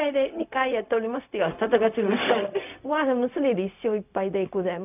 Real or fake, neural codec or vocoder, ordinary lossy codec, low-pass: fake; codec, 16 kHz, 0.4 kbps, LongCat-Audio-Codec; none; 3.6 kHz